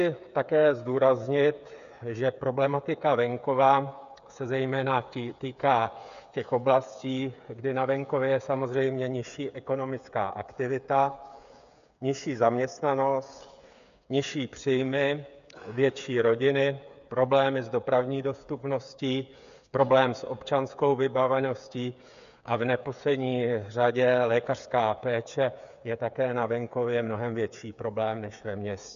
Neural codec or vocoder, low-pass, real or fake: codec, 16 kHz, 8 kbps, FreqCodec, smaller model; 7.2 kHz; fake